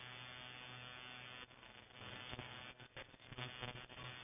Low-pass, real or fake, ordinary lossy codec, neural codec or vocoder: 3.6 kHz; real; none; none